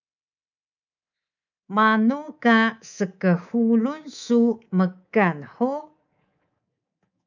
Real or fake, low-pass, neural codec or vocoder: fake; 7.2 kHz; codec, 24 kHz, 3.1 kbps, DualCodec